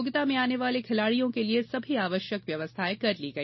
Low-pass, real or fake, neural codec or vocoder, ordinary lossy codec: 7.2 kHz; real; none; MP3, 24 kbps